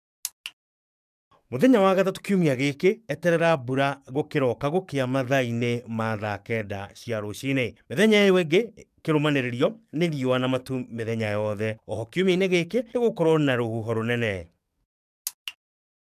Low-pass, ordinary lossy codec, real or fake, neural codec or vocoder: 14.4 kHz; none; fake; codec, 44.1 kHz, 7.8 kbps, DAC